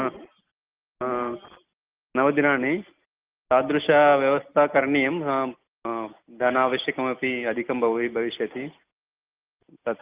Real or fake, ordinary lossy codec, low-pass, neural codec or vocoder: real; Opus, 32 kbps; 3.6 kHz; none